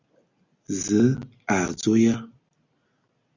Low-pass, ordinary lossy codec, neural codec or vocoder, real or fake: 7.2 kHz; Opus, 64 kbps; none; real